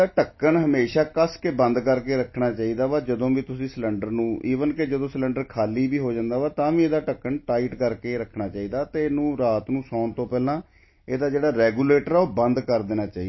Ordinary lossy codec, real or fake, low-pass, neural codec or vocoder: MP3, 24 kbps; real; 7.2 kHz; none